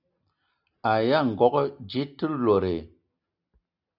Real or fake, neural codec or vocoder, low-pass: real; none; 5.4 kHz